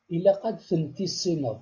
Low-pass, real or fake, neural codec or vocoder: 7.2 kHz; real; none